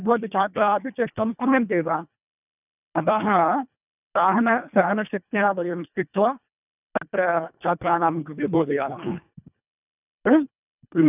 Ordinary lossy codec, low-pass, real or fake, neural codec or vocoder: none; 3.6 kHz; fake; codec, 24 kHz, 1.5 kbps, HILCodec